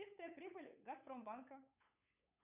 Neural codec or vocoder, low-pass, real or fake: codec, 16 kHz, 8 kbps, FunCodec, trained on LibriTTS, 25 frames a second; 3.6 kHz; fake